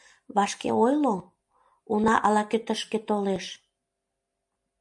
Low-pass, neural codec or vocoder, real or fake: 10.8 kHz; none; real